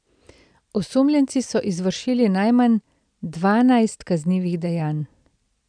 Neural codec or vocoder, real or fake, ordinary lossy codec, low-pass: none; real; none; 9.9 kHz